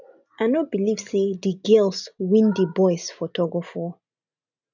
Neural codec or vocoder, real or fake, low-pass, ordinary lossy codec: none; real; 7.2 kHz; none